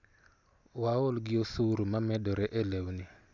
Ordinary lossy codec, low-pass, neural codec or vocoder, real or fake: none; 7.2 kHz; none; real